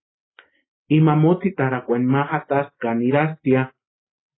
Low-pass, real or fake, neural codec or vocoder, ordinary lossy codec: 7.2 kHz; real; none; AAC, 16 kbps